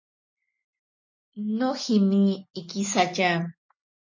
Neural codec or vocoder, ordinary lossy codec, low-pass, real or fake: none; MP3, 32 kbps; 7.2 kHz; real